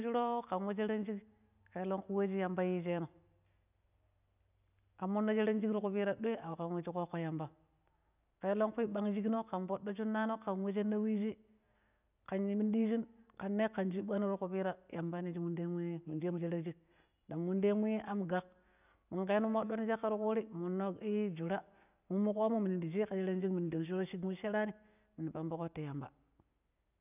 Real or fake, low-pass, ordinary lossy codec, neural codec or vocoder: real; 3.6 kHz; none; none